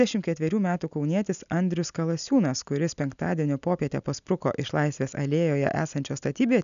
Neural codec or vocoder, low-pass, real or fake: none; 7.2 kHz; real